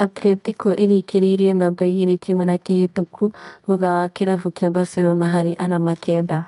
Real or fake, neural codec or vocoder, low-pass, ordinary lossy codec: fake; codec, 24 kHz, 0.9 kbps, WavTokenizer, medium music audio release; 10.8 kHz; none